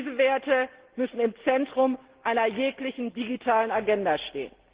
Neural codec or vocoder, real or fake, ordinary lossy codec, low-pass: none; real; Opus, 16 kbps; 3.6 kHz